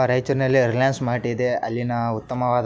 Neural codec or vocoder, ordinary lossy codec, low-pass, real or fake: none; none; none; real